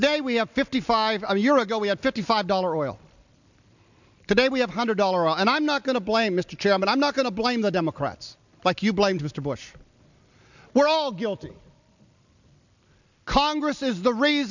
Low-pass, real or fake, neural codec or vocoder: 7.2 kHz; real; none